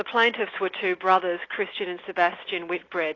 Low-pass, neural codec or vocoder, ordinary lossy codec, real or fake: 7.2 kHz; none; AAC, 32 kbps; real